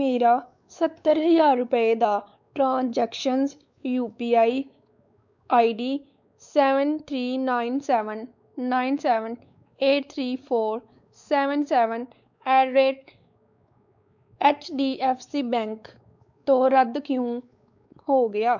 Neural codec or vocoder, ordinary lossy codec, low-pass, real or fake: codec, 16 kHz, 4 kbps, X-Codec, WavLM features, trained on Multilingual LibriSpeech; none; 7.2 kHz; fake